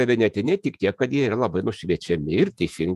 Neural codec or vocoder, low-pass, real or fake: vocoder, 48 kHz, 128 mel bands, Vocos; 14.4 kHz; fake